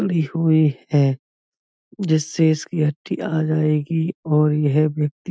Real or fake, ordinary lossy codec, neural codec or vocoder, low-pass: real; none; none; none